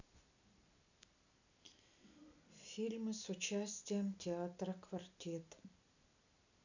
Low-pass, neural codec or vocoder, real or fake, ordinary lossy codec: 7.2 kHz; none; real; none